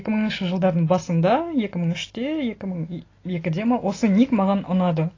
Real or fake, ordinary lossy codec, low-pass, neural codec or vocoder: real; AAC, 32 kbps; 7.2 kHz; none